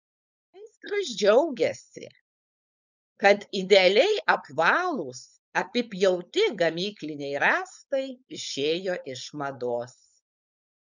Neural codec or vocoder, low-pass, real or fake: codec, 16 kHz, 4.8 kbps, FACodec; 7.2 kHz; fake